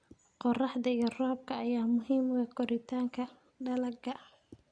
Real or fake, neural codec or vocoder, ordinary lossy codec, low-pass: real; none; Opus, 64 kbps; 9.9 kHz